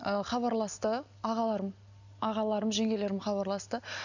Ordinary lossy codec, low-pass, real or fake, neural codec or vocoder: none; 7.2 kHz; real; none